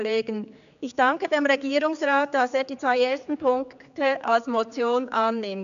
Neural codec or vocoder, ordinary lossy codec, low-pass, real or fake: codec, 16 kHz, 4 kbps, X-Codec, HuBERT features, trained on general audio; none; 7.2 kHz; fake